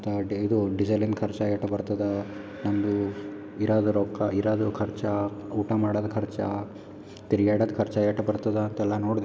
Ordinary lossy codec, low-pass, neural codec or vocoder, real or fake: none; none; none; real